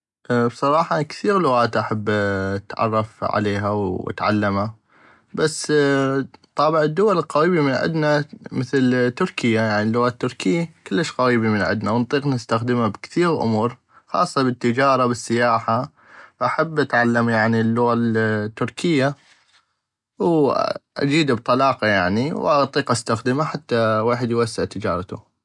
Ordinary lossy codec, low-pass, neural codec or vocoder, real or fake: none; 10.8 kHz; none; real